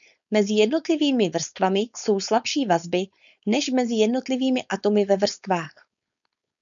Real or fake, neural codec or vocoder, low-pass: fake; codec, 16 kHz, 4.8 kbps, FACodec; 7.2 kHz